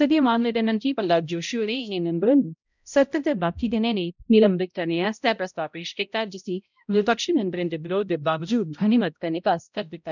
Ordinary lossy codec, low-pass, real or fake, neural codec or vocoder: none; 7.2 kHz; fake; codec, 16 kHz, 0.5 kbps, X-Codec, HuBERT features, trained on balanced general audio